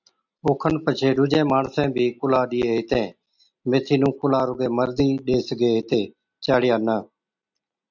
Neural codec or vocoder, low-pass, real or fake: none; 7.2 kHz; real